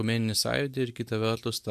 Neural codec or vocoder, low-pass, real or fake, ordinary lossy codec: none; 14.4 kHz; real; MP3, 96 kbps